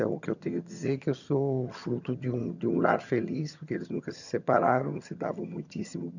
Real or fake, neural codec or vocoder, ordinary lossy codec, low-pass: fake; vocoder, 22.05 kHz, 80 mel bands, HiFi-GAN; none; 7.2 kHz